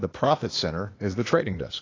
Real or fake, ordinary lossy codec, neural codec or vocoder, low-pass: fake; AAC, 32 kbps; codec, 16 kHz, 0.8 kbps, ZipCodec; 7.2 kHz